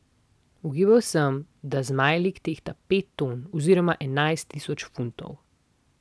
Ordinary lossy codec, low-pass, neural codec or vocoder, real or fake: none; none; none; real